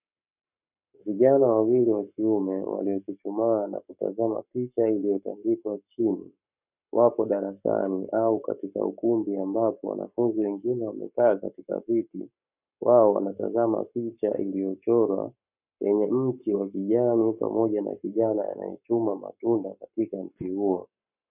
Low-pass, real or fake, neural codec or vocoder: 3.6 kHz; fake; codec, 44.1 kHz, 7.8 kbps, Pupu-Codec